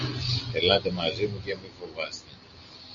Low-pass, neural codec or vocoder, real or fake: 7.2 kHz; none; real